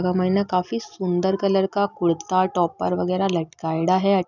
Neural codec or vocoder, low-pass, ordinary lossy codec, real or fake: none; 7.2 kHz; none; real